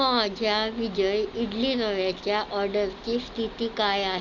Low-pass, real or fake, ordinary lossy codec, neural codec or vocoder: 7.2 kHz; real; none; none